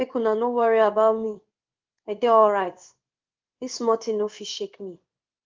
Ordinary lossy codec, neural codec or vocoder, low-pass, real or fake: Opus, 24 kbps; codec, 16 kHz in and 24 kHz out, 1 kbps, XY-Tokenizer; 7.2 kHz; fake